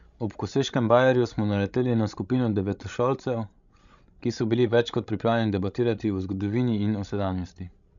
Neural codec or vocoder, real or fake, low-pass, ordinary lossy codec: codec, 16 kHz, 16 kbps, FreqCodec, larger model; fake; 7.2 kHz; none